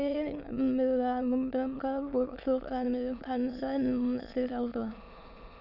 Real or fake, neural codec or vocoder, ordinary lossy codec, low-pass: fake; autoencoder, 22.05 kHz, a latent of 192 numbers a frame, VITS, trained on many speakers; none; 5.4 kHz